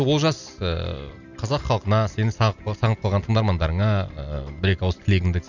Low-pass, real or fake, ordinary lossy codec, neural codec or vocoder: 7.2 kHz; real; none; none